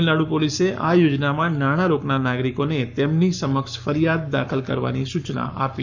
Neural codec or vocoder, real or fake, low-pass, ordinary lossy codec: codec, 44.1 kHz, 7.8 kbps, Pupu-Codec; fake; 7.2 kHz; none